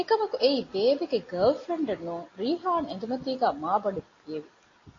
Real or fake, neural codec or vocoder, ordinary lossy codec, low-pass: real; none; AAC, 32 kbps; 7.2 kHz